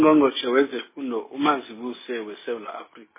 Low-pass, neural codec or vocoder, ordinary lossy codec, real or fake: 3.6 kHz; none; MP3, 16 kbps; real